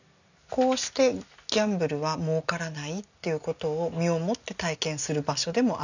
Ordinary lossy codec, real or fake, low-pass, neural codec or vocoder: none; real; 7.2 kHz; none